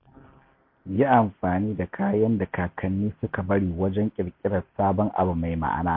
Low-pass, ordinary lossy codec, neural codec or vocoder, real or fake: 5.4 kHz; MP3, 32 kbps; none; real